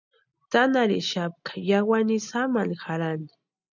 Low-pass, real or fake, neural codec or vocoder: 7.2 kHz; real; none